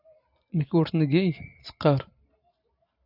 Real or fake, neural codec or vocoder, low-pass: fake; vocoder, 44.1 kHz, 128 mel bands every 512 samples, BigVGAN v2; 5.4 kHz